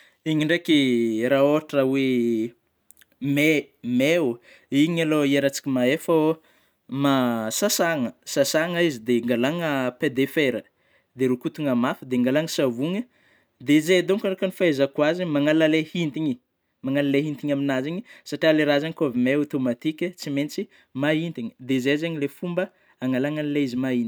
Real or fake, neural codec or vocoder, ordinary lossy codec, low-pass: real; none; none; none